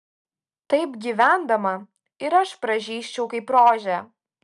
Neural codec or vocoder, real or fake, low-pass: none; real; 10.8 kHz